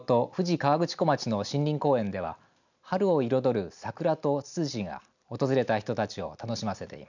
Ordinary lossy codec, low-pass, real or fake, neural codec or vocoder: none; 7.2 kHz; real; none